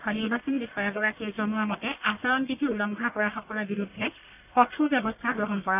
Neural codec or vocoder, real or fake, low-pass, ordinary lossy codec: codec, 44.1 kHz, 1.7 kbps, Pupu-Codec; fake; 3.6 kHz; none